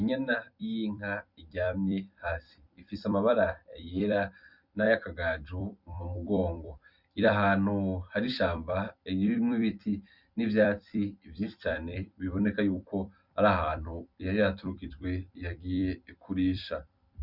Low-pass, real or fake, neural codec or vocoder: 5.4 kHz; fake; vocoder, 44.1 kHz, 128 mel bands every 256 samples, BigVGAN v2